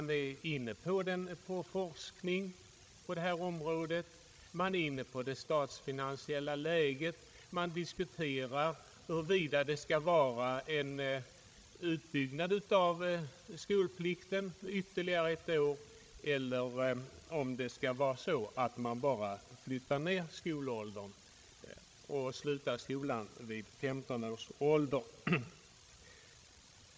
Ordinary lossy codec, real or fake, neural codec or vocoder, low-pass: none; fake; codec, 16 kHz, 16 kbps, FreqCodec, larger model; none